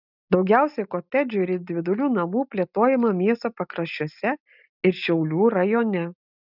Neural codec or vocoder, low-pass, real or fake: none; 5.4 kHz; real